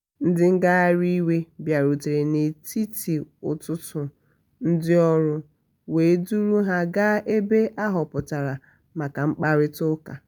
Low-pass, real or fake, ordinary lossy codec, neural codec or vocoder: none; real; none; none